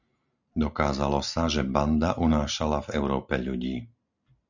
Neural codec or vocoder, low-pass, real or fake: none; 7.2 kHz; real